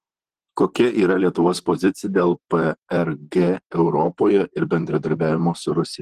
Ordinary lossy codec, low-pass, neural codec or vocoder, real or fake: Opus, 16 kbps; 14.4 kHz; vocoder, 44.1 kHz, 128 mel bands, Pupu-Vocoder; fake